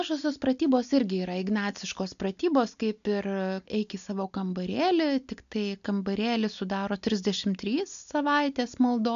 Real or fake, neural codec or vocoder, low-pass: real; none; 7.2 kHz